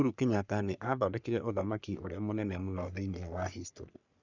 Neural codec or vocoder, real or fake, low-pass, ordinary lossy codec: codec, 44.1 kHz, 3.4 kbps, Pupu-Codec; fake; 7.2 kHz; none